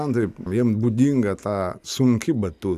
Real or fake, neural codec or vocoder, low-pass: real; none; 14.4 kHz